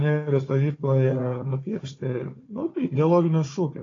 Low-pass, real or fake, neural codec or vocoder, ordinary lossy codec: 7.2 kHz; fake; codec, 16 kHz, 4 kbps, FunCodec, trained on Chinese and English, 50 frames a second; AAC, 32 kbps